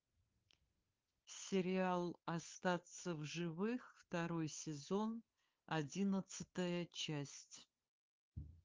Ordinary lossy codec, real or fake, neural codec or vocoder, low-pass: Opus, 32 kbps; fake; codec, 16 kHz, 2 kbps, FunCodec, trained on Chinese and English, 25 frames a second; 7.2 kHz